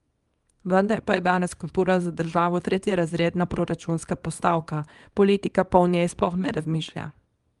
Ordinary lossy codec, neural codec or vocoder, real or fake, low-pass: Opus, 32 kbps; codec, 24 kHz, 0.9 kbps, WavTokenizer, small release; fake; 10.8 kHz